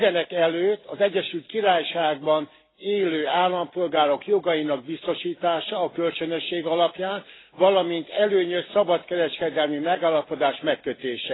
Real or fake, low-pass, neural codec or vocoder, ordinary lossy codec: real; 7.2 kHz; none; AAC, 16 kbps